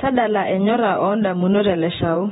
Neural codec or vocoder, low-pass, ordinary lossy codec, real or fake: vocoder, 48 kHz, 128 mel bands, Vocos; 19.8 kHz; AAC, 16 kbps; fake